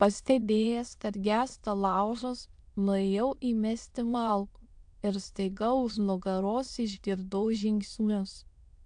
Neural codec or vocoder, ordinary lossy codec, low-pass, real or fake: autoencoder, 22.05 kHz, a latent of 192 numbers a frame, VITS, trained on many speakers; AAC, 64 kbps; 9.9 kHz; fake